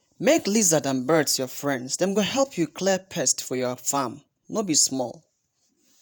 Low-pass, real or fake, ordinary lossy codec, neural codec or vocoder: none; fake; none; vocoder, 48 kHz, 128 mel bands, Vocos